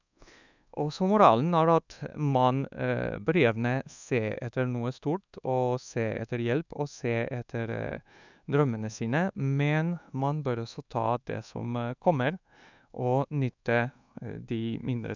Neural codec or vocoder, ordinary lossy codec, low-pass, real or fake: codec, 24 kHz, 1.2 kbps, DualCodec; none; 7.2 kHz; fake